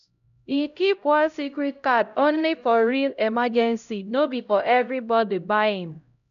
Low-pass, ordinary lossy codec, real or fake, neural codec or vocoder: 7.2 kHz; none; fake; codec, 16 kHz, 0.5 kbps, X-Codec, HuBERT features, trained on LibriSpeech